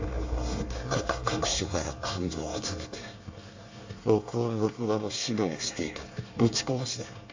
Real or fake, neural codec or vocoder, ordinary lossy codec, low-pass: fake; codec, 24 kHz, 1 kbps, SNAC; AAC, 48 kbps; 7.2 kHz